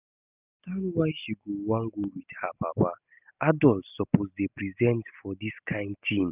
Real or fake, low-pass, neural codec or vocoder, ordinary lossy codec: real; 3.6 kHz; none; none